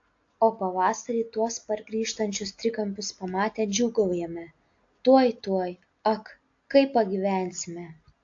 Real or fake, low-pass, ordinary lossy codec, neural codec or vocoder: real; 7.2 kHz; AAC, 48 kbps; none